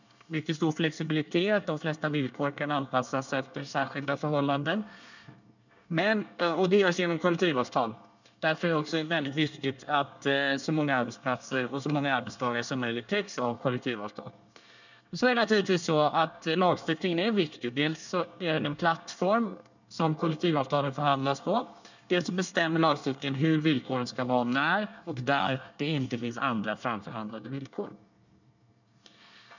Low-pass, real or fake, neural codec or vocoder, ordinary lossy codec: 7.2 kHz; fake; codec, 24 kHz, 1 kbps, SNAC; none